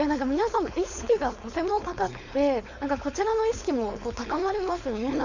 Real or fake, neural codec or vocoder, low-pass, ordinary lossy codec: fake; codec, 16 kHz, 4.8 kbps, FACodec; 7.2 kHz; none